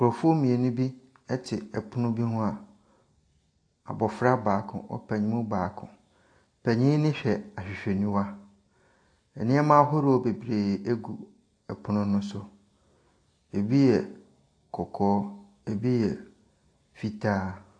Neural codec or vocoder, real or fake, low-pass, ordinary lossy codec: none; real; 9.9 kHz; AAC, 48 kbps